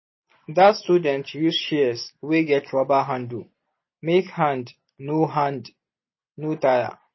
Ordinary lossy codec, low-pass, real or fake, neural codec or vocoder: MP3, 24 kbps; 7.2 kHz; real; none